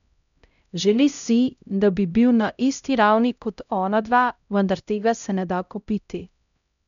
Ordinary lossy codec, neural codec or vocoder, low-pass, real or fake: none; codec, 16 kHz, 0.5 kbps, X-Codec, HuBERT features, trained on LibriSpeech; 7.2 kHz; fake